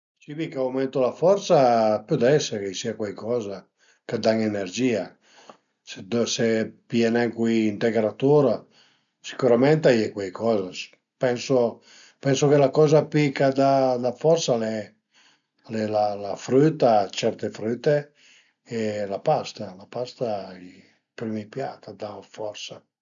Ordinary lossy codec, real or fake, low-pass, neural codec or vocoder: MP3, 96 kbps; real; 7.2 kHz; none